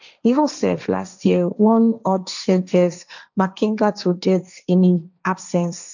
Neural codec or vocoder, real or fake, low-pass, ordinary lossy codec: codec, 16 kHz, 1.1 kbps, Voila-Tokenizer; fake; 7.2 kHz; none